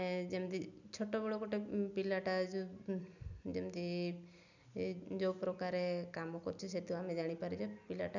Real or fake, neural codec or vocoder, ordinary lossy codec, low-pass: real; none; none; 7.2 kHz